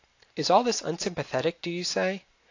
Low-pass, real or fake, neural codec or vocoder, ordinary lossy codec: 7.2 kHz; real; none; AAC, 48 kbps